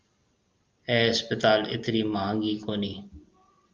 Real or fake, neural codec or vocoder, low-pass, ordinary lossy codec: real; none; 7.2 kHz; Opus, 24 kbps